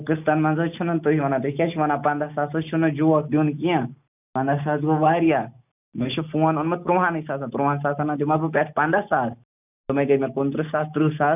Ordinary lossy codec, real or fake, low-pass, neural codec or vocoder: none; real; 3.6 kHz; none